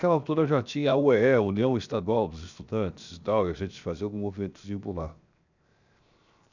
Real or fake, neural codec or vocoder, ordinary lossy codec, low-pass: fake; codec, 16 kHz, 0.7 kbps, FocalCodec; none; 7.2 kHz